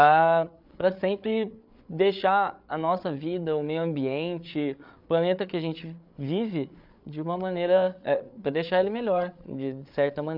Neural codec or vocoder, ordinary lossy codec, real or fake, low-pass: codec, 16 kHz, 8 kbps, FreqCodec, larger model; none; fake; 5.4 kHz